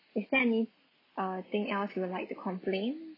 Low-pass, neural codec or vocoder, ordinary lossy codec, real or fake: 5.4 kHz; none; AAC, 24 kbps; real